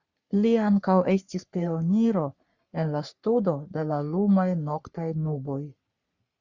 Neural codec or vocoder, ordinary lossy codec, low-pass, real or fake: codec, 44.1 kHz, 3.4 kbps, Pupu-Codec; Opus, 64 kbps; 7.2 kHz; fake